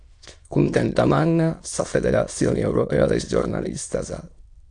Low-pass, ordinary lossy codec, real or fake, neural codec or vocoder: 9.9 kHz; AAC, 64 kbps; fake; autoencoder, 22.05 kHz, a latent of 192 numbers a frame, VITS, trained on many speakers